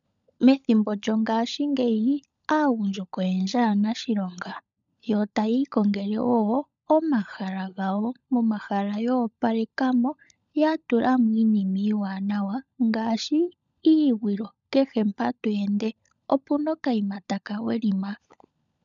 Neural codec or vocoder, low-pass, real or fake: codec, 16 kHz, 16 kbps, FunCodec, trained on LibriTTS, 50 frames a second; 7.2 kHz; fake